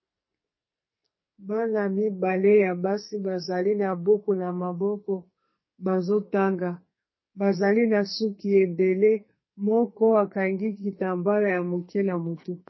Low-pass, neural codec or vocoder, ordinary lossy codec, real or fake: 7.2 kHz; codec, 44.1 kHz, 2.6 kbps, SNAC; MP3, 24 kbps; fake